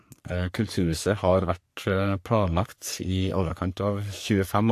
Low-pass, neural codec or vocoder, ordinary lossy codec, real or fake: 14.4 kHz; codec, 44.1 kHz, 3.4 kbps, Pupu-Codec; AAC, 64 kbps; fake